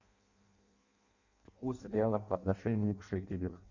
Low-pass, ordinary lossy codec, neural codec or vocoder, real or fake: 7.2 kHz; Opus, 64 kbps; codec, 16 kHz in and 24 kHz out, 0.6 kbps, FireRedTTS-2 codec; fake